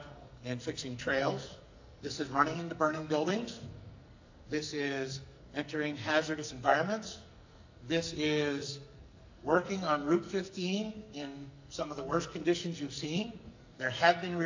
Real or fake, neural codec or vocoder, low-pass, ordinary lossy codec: fake; codec, 44.1 kHz, 2.6 kbps, SNAC; 7.2 kHz; AAC, 48 kbps